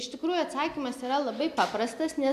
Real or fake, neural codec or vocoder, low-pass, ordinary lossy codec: real; none; 14.4 kHz; AAC, 96 kbps